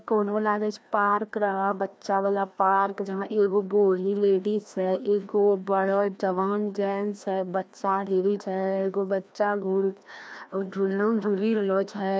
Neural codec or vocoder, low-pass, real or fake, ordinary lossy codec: codec, 16 kHz, 1 kbps, FreqCodec, larger model; none; fake; none